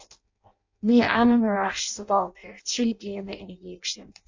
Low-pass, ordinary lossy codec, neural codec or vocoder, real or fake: 7.2 kHz; AAC, 48 kbps; codec, 16 kHz in and 24 kHz out, 0.6 kbps, FireRedTTS-2 codec; fake